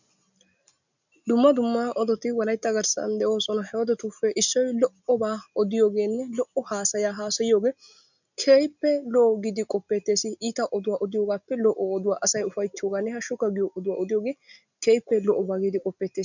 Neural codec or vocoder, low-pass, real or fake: none; 7.2 kHz; real